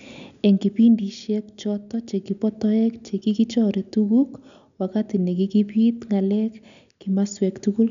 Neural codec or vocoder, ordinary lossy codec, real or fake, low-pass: none; none; real; 7.2 kHz